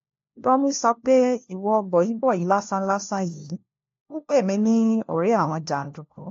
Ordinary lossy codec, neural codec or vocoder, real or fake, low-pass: AAC, 48 kbps; codec, 16 kHz, 1 kbps, FunCodec, trained on LibriTTS, 50 frames a second; fake; 7.2 kHz